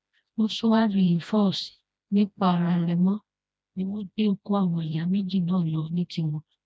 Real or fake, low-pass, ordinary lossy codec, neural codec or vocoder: fake; none; none; codec, 16 kHz, 1 kbps, FreqCodec, smaller model